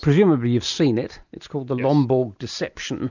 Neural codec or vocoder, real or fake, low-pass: none; real; 7.2 kHz